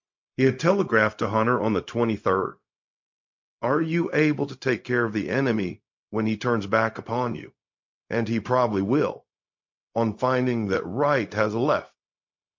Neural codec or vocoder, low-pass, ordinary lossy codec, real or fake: codec, 16 kHz, 0.4 kbps, LongCat-Audio-Codec; 7.2 kHz; MP3, 48 kbps; fake